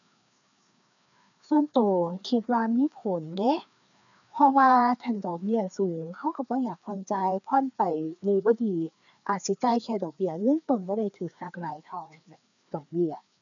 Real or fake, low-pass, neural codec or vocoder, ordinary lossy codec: fake; 7.2 kHz; codec, 16 kHz, 2 kbps, FreqCodec, larger model; none